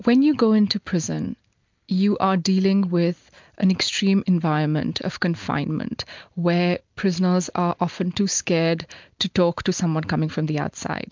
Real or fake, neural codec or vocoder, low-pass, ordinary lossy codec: real; none; 7.2 kHz; MP3, 64 kbps